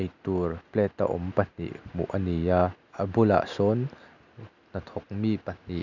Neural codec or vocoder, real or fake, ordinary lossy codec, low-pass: none; real; none; 7.2 kHz